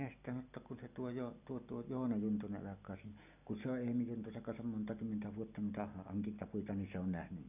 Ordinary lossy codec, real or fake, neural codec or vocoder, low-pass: none; real; none; 3.6 kHz